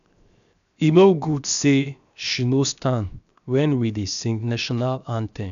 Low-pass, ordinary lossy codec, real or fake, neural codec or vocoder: 7.2 kHz; none; fake; codec, 16 kHz, 0.7 kbps, FocalCodec